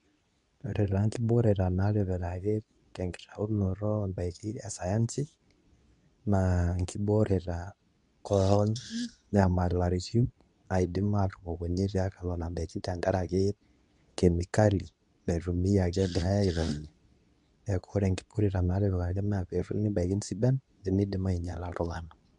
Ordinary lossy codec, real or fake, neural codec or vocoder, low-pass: none; fake; codec, 24 kHz, 0.9 kbps, WavTokenizer, medium speech release version 2; 10.8 kHz